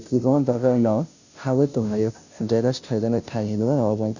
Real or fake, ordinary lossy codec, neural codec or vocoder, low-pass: fake; none; codec, 16 kHz, 0.5 kbps, FunCodec, trained on Chinese and English, 25 frames a second; 7.2 kHz